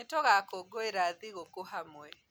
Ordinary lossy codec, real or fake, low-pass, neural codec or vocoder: none; real; none; none